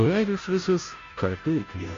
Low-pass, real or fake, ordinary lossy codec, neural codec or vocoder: 7.2 kHz; fake; MP3, 48 kbps; codec, 16 kHz, 0.5 kbps, FunCodec, trained on Chinese and English, 25 frames a second